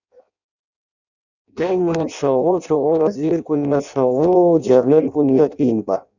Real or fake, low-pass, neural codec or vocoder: fake; 7.2 kHz; codec, 16 kHz in and 24 kHz out, 0.6 kbps, FireRedTTS-2 codec